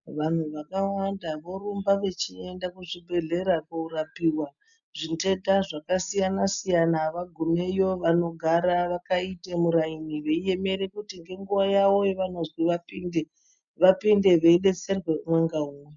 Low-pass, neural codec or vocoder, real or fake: 7.2 kHz; none; real